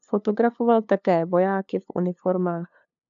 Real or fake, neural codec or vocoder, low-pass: fake; codec, 16 kHz, 2 kbps, FunCodec, trained on LibriTTS, 25 frames a second; 7.2 kHz